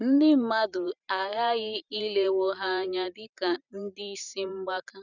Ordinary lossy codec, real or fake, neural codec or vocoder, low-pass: none; fake; vocoder, 44.1 kHz, 128 mel bands every 512 samples, BigVGAN v2; 7.2 kHz